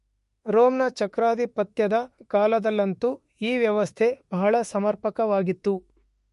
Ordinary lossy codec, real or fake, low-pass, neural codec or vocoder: MP3, 48 kbps; fake; 14.4 kHz; autoencoder, 48 kHz, 32 numbers a frame, DAC-VAE, trained on Japanese speech